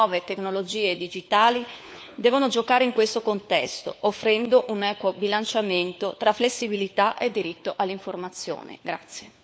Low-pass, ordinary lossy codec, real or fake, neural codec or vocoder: none; none; fake; codec, 16 kHz, 4 kbps, FunCodec, trained on LibriTTS, 50 frames a second